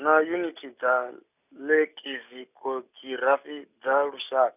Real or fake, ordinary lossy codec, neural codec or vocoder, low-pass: real; none; none; 3.6 kHz